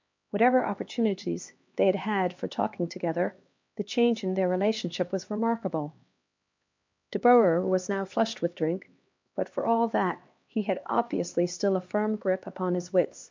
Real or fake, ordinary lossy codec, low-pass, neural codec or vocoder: fake; MP3, 64 kbps; 7.2 kHz; codec, 16 kHz, 2 kbps, X-Codec, HuBERT features, trained on LibriSpeech